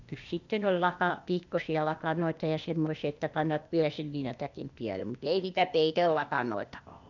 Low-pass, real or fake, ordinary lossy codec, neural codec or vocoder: 7.2 kHz; fake; none; codec, 16 kHz, 0.8 kbps, ZipCodec